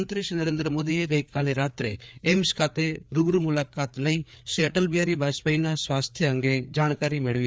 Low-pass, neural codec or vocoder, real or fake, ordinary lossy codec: none; codec, 16 kHz, 4 kbps, FreqCodec, larger model; fake; none